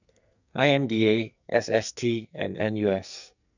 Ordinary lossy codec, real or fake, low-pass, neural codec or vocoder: none; fake; 7.2 kHz; codec, 44.1 kHz, 2.6 kbps, SNAC